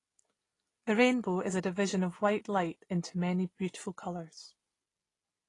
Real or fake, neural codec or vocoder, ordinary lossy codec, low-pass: real; none; AAC, 32 kbps; 10.8 kHz